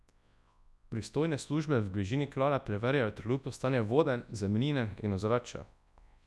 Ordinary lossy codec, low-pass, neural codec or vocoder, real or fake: none; none; codec, 24 kHz, 0.9 kbps, WavTokenizer, large speech release; fake